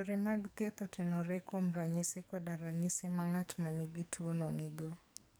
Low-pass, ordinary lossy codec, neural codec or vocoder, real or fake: none; none; codec, 44.1 kHz, 2.6 kbps, SNAC; fake